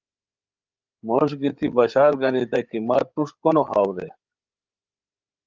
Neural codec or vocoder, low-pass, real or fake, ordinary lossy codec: codec, 16 kHz, 8 kbps, FreqCodec, larger model; 7.2 kHz; fake; Opus, 32 kbps